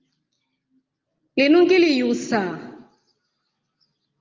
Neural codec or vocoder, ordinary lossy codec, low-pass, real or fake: none; Opus, 24 kbps; 7.2 kHz; real